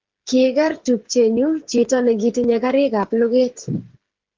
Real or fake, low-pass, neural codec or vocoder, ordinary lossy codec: fake; 7.2 kHz; codec, 16 kHz, 8 kbps, FreqCodec, smaller model; Opus, 16 kbps